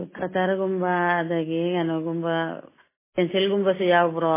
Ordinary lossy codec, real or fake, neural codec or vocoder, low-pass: MP3, 16 kbps; real; none; 3.6 kHz